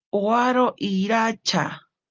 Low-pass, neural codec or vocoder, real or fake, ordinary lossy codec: 7.2 kHz; none; real; Opus, 24 kbps